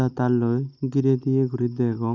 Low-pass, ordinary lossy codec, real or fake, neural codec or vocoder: 7.2 kHz; none; real; none